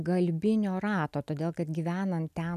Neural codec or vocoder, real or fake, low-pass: none; real; 14.4 kHz